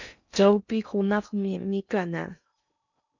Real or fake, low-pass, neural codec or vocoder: fake; 7.2 kHz; codec, 16 kHz in and 24 kHz out, 0.6 kbps, FocalCodec, streaming, 2048 codes